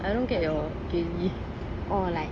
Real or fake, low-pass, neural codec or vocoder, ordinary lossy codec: real; 9.9 kHz; none; AAC, 32 kbps